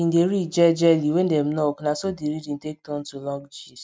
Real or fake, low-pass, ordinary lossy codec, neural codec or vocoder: real; none; none; none